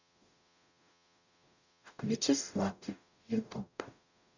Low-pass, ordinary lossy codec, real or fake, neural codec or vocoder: 7.2 kHz; none; fake; codec, 44.1 kHz, 0.9 kbps, DAC